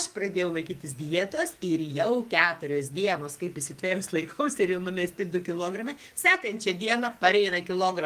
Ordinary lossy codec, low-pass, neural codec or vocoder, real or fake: Opus, 32 kbps; 14.4 kHz; codec, 44.1 kHz, 2.6 kbps, SNAC; fake